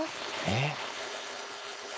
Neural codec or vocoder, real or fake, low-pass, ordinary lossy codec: codec, 16 kHz, 4.8 kbps, FACodec; fake; none; none